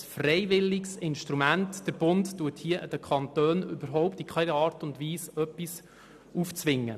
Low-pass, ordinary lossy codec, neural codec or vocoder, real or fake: 14.4 kHz; none; none; real